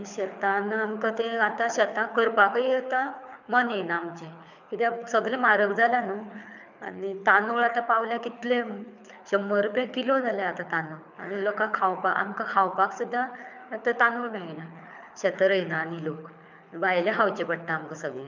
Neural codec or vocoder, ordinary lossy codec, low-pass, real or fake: codec, 24 kHz, 6 kbps, HILCodec; none; 7.2 kHz; fake